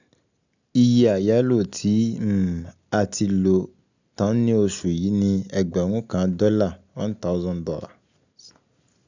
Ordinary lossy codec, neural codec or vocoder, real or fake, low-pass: none; none; real; 7.2 kHz